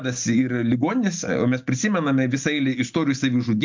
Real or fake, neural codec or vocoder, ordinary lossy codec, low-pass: real; none; MP3, 64 kbps; 7.2 kHz